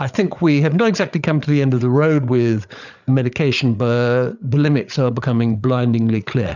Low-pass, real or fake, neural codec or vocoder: 7.2 kHz; fake; codec, 16 kHz, 8 kbps, FreqCodec, larger model